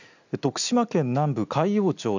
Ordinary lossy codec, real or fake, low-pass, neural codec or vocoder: none; real; 7.2 kHz; none